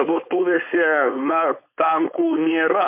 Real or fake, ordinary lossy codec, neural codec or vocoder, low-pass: fake; MP3, 24 kbps; codec, 16 kHz, 4.8 kbps, FACodec; 3.6 kHz